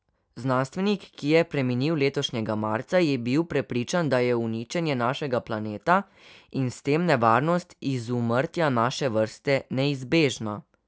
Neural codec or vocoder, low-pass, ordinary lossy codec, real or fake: none; none; none; real